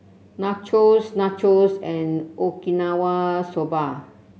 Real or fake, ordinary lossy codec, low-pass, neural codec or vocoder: real; none; none; none